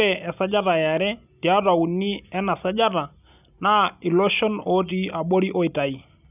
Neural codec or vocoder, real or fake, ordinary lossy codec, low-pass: none; real; none; 3.6 kHz